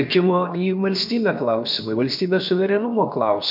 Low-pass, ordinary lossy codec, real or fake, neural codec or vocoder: 5.4 kHz; MP3, 48 kbps; fake; codec, 16 kHz, about 1 kbps, DyCAST, with the encoder's durations